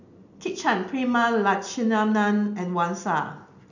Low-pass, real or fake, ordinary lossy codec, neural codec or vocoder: 7.2 kHz; real; none; none